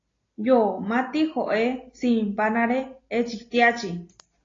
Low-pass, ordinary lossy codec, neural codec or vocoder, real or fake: 7.2 kHz; AAC, 32 kbps; none; real